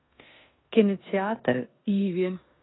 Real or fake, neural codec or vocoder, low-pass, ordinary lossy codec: fake; codec, 16 kHz in and 24 kHz out, 0.9 kbps, LongCat-Audio-Codec, four codebook decoder; 7.2 kHz; AAC, 16 kbps